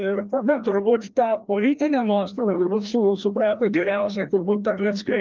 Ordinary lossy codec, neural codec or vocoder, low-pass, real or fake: Opus, 32 kbps; codec, 16 kHz, 1 kbps, FreqCodec, larger model; 7.2 kHz; fake